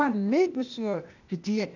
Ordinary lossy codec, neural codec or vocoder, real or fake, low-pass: none; codec, 16 kHz, 0.8 kbps, ZipCodec; fake; 7.2 kHz